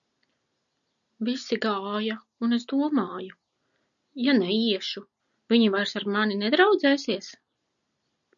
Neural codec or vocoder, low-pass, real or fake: none; 7.2 kHz; real